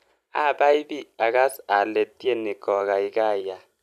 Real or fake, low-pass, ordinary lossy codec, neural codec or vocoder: fake; 14.4 kHz; none; vocoder, 44.1 kHz, 128 mel bands every 256 samples, BigVGAN v2